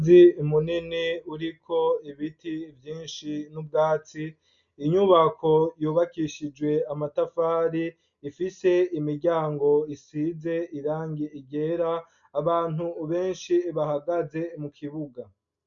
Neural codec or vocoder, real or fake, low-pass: none; real; 7.2 kHz